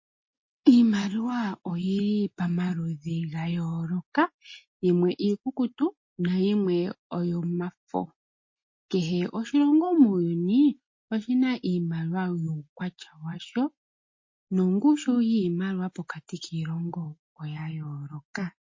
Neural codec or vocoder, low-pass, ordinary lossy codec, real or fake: none; 7.2 kHz; MP3, 32 kbps; real